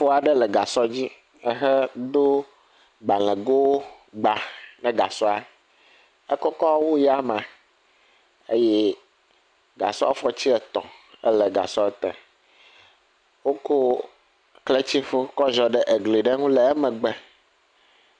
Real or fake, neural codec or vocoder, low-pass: real; none; 9.9 kHz